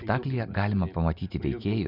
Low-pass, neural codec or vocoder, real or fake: 5.4 kHz; none; real